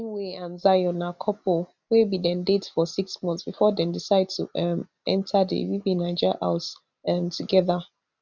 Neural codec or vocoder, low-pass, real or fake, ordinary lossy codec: none; 7.2 kHz; real; none